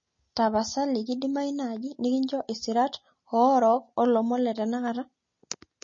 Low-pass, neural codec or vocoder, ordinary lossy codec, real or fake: 7.2 kHz; none; MP3, 32 kbps; real